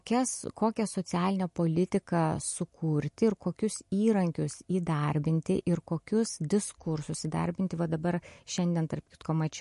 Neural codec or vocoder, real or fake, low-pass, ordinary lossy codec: none; real; 14.4 kHz; MP3, 48 kbps